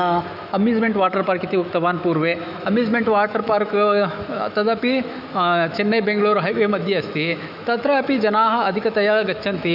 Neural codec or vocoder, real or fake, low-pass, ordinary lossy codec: autoencoder, 48 kHz, 128 numbers a frame, DAC-VAE, trained on Japanese speech; fake; 5.4 kHz; none